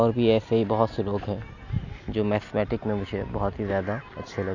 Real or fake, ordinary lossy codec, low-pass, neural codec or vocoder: real; none; 7.2 kHz; none